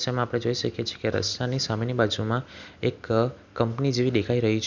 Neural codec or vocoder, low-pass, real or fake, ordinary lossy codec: none; 7.2 kHz; real; none